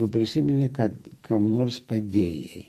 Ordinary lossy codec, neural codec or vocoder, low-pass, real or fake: MP3, 64 kbps; codec, 44.1 kHz, 2.6 kbps, SNAC; 14.4 kHz; fake